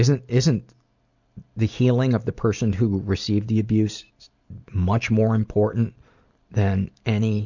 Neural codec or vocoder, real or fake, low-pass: none; real; 7.2 kHz